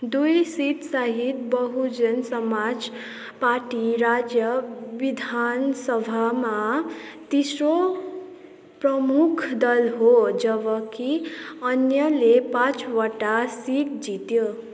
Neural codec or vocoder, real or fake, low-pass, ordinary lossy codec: none; real; none; none